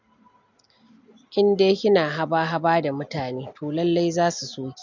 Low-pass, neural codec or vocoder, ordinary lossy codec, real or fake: 7.2 kHz; none; MP3, 64 kbps; real